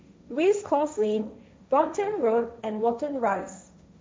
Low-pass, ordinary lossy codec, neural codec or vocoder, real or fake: none; none; codec, 16 kHz, 1.1 kbps, Voila-Tokenizer; fake